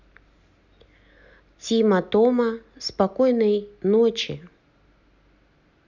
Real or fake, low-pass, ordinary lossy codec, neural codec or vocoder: real; 7.2 kHz; none; none